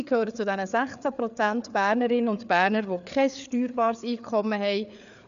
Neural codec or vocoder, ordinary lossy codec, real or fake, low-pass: codec, 16 kHz, 4 kbps, FreqCodec, larger model; none; fake; 7.2 kHz